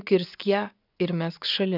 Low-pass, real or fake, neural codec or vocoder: 5.4 kHz; fake; vocoder, 24 kHz, 100 mel bands, Vocos